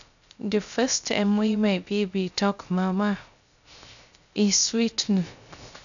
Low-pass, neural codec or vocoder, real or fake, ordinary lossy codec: 7.2 kHz; codec, 16 kHz, 0.3 kbps, FocalCodec; fake; none